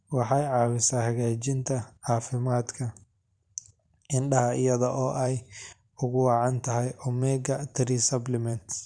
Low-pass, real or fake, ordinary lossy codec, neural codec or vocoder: 9.9 kHz; fake; none; vocoder, 44.1 kHz, 128 mel bands every 512 samples, BigVGAN v2